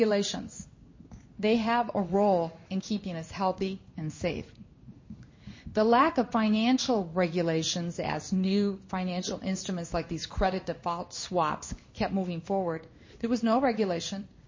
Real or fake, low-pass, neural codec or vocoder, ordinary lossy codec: fake; 7.2 kHz; codec, 16 kHz in and 24 kHz out, 1 kbps, XY-Tokenizer; MP3, 32 kbps